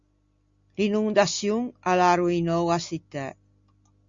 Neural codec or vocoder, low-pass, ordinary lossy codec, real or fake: none; 7.2 kHz; Opus, 64 kbps; real